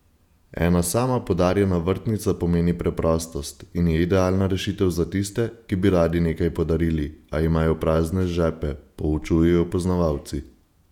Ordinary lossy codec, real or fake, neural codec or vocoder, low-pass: none; fake; vocoder, 48 kHz, 128 mel bands, Vocos; 19.8 kHz